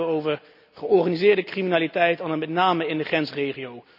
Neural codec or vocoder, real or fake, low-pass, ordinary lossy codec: none; real; 5.4 kHz; none